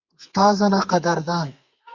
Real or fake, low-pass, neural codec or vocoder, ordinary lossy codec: fake; 7.2 kHz; codec, 32 kHz, 1.9 kbps, SNAC; Opus, 64 kbps